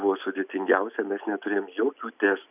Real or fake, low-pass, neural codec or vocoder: real; 3.6 kHz; none